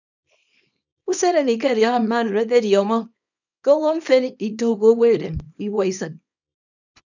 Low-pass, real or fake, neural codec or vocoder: 7.2 kHz; fake; codec, 24 kHz, 0.9 kbps, WavTokenizer, small release